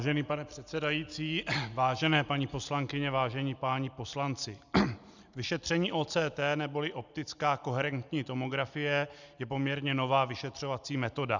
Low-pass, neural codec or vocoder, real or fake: 7.2 kHz; none; real